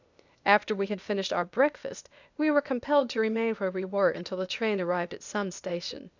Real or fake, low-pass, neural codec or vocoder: fake; 7.2 kHz; codec, 16 kHz, 0.8 kbps, ZipCodec